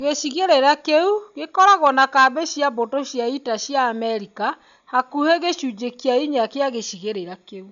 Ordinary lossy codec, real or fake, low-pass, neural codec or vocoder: none; real; 7.2 kHz; none